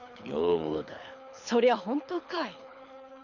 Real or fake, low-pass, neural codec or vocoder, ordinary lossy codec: fake; 7.2 kHz; codec, 24 kHz, 6 kbps, HILCodec; none